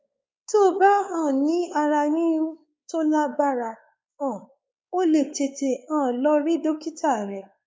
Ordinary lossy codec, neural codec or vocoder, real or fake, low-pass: none; codec, 16 kHz, 4 kbps, X-Codec, WavLM features, trained on Multilingual LibriSpeech; fake; none